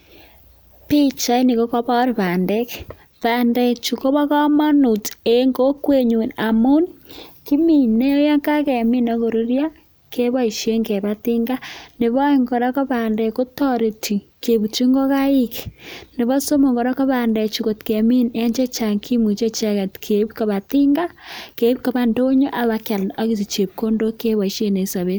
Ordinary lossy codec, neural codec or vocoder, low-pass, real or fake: none; none; none; real